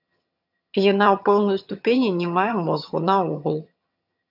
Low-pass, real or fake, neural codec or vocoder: 5.4 kHz; fake; vocoder, 22.05 kHz, 80 mel bands, HiFi-GAN